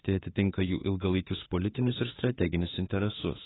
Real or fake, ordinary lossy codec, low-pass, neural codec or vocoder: fake; AAC, 16 kbps; 7.2 kHz; autoencoder, 48 kHz, 32 numbers a frame, DAC-VAE, trained on Japanese speech